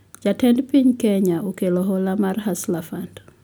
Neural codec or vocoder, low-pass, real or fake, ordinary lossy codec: none; none; real; none